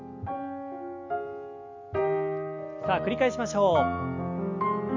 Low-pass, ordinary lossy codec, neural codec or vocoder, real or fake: 7.2 kHz; MP3, 48 kbps; none; real